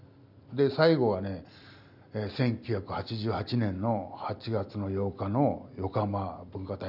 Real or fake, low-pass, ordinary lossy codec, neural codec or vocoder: real; 5.4 kHz; none; none